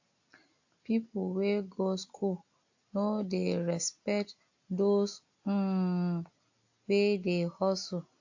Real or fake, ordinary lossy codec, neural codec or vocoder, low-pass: real; AAC, 48 kbps; none; 7.2 kHz